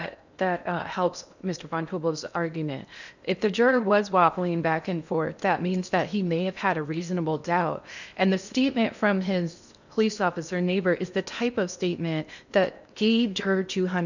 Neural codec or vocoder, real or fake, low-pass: codec, 16 kHz in and 24 kHz out, 0.8 kbps, FocalCodec, streaming, 65536 codes; fake; 7.2 kHz